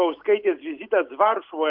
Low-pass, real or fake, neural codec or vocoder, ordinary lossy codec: 5.4 kHz; real; none; Opus, 24 kbps